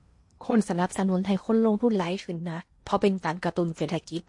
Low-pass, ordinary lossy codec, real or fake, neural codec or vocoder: 10.8 kHz; MP3, 48 kbps; fake; codec, 16 kHz in and 24 kHz out, 0.8 kbps, FocalCodec, streaming, 65536 codes